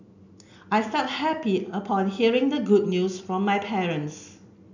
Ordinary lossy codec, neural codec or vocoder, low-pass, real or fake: none; none; 7.2 kHz; real